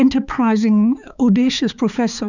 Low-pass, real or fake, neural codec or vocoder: 7.2 kHz; fake; codec, 16 kHz, 4 kbps, X-Codec, HuBERT features, trained on balanced general audio